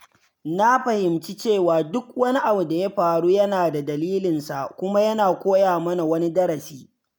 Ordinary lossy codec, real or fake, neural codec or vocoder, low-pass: none; real; none; none